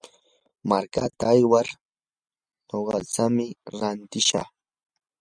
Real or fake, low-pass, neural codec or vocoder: real; 9.9 kHz; none